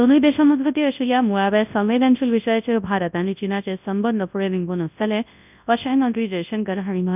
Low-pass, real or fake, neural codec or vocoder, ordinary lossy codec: 3.6 kHz; fake; codec, 24 kHz, 0.9 kbps, WavTokenizer, large speech release; none